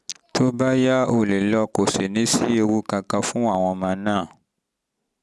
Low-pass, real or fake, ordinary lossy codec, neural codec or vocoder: none; real; none; none